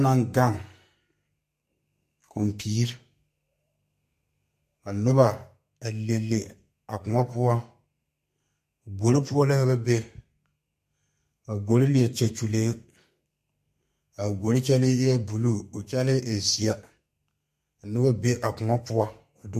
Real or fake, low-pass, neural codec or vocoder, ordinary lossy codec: fake; 14.4 kHz; codec, 32 kHz, 1.9 kbps, SNAC; MP3, 64 kbps